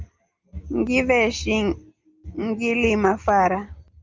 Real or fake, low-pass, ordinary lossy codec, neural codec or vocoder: real; 7.2 kHz; Opus, 24 kbps; none